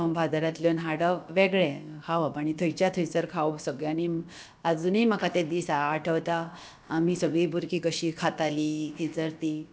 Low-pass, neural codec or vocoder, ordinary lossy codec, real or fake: none; codec, 16 kHz, about 1 kbps, DyCAST, with the encoder's durations; none; fake